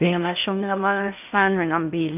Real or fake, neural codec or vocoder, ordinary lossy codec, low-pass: fake; codec, 16 kHz in and 24 kHz out, 0.6 kbps, FocalCodec, streaming, 2048 codes; none; 3.6 kHz